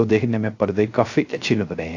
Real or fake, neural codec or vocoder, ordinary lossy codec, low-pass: fake; codec, 16 kHz, 0.3 kbps, FocalCodec; AAC, 48 kbps; 7.2 kHz